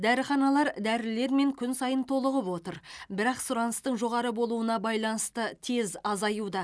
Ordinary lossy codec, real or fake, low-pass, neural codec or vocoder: none; real; none; none